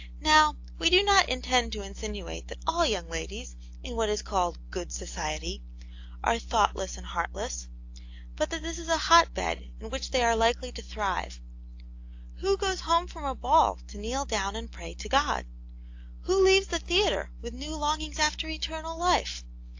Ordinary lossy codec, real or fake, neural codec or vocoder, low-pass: AAC, 48 kbps; real; none; 7.2 kHz